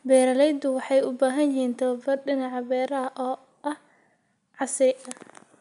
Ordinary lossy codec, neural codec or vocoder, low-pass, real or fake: none; none; 10.8 kHz; real